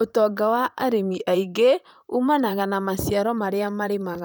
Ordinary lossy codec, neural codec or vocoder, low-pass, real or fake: none; vocoder, 44.1 kHz, 128 mel bands, Pupu-Vocoder; none; fake